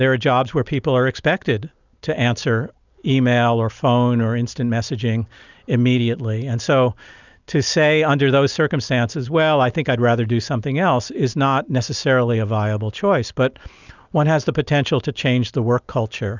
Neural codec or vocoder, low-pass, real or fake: none; 7.2 kHz; real